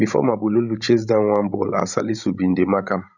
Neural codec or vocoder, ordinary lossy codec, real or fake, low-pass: codec, 16 kHz, 16 kbps, FreqCodec, larger model; none; fake; 7.2 kHz